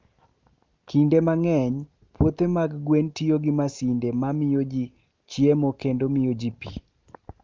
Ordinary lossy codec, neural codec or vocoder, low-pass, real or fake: Opus, 24 kbps; none; 7.2 kHz; real